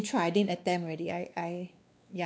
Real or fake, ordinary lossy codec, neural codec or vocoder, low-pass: fake; none; codec, 16 kHz, 2 kbps, X-Codec, WavLM features, trained on Multilingual LibriSpeech; none